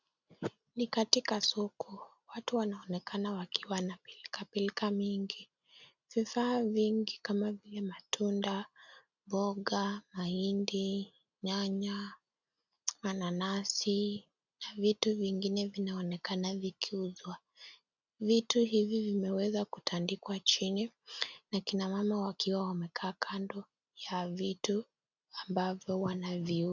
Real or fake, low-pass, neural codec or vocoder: real; 7.2 kHz; none